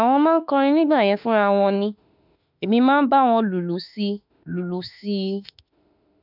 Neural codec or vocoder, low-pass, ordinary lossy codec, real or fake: autoencoder, 48 kHz, 32 numbers a frame, DAC-VAE, trained on Japanese speech; 5.4 kHz; none; fake